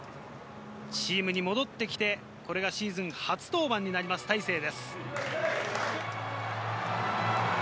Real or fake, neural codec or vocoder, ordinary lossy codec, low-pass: real; none; none; none